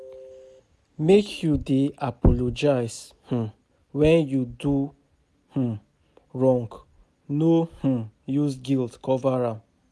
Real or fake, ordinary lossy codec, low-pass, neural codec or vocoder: real; none; none; none